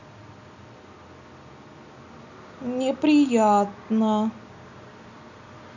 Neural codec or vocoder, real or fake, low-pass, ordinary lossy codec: none; real; 7.2 kHz; none